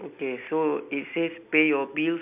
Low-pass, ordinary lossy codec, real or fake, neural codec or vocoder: 3.6 kHz; AAC, 32 kbps; real; none